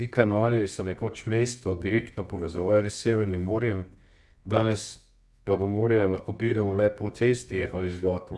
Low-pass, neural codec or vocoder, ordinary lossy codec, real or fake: none; codec, 24 kHz, 0.9 kbps, WavTokenizer, medium music audio release; none; fake